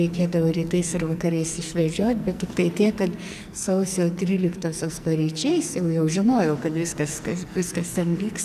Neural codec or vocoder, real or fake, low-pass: codec, 44.1 kHz, 2.6 kbps, SNAC; fake; 14.4 kHz